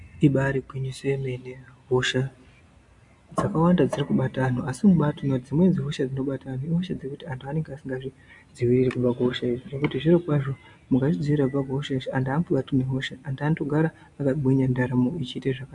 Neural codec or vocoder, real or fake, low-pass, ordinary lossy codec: none; real; 10.8 kHz; MP3, 64 kbps